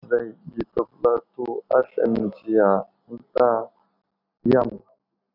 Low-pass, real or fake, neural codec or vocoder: 5.4 kHz; real; none